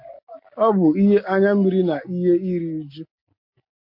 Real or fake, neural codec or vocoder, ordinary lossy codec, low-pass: real; none; MP3, 48 kbps; 5.4 kHz